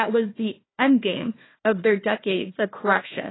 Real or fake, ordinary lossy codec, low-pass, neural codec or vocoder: fake; AAC, 16 kbps; 7.2 kHz; codec, 16 kHz, 1 kbps, FunCodec, trained on Chinese and English, 50 frames a second